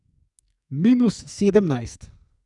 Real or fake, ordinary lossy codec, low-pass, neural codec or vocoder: fake; none; 10.8 kHz; codec, 44.1 kHz, 2.6 kbps, SNAC